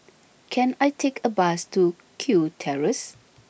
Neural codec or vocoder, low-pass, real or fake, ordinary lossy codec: none; none; real; none